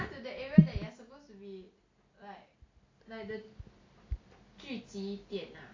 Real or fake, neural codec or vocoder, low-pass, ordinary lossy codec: real; none; 7.2 kHz; AAC, 32 kbps